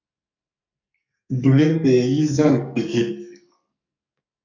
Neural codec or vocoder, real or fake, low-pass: codec, 44.1 kHz, 2.6 kbps, SNAC; fake; 7.2 kHz